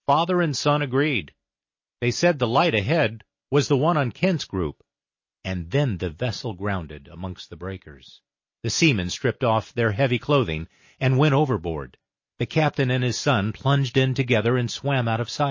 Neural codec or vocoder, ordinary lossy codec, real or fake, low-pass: none; MP3, 32 kbps; real; 7.2 kHz